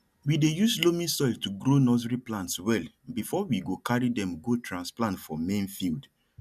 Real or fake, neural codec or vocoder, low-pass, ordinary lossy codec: real; none; 14.4 kHz; none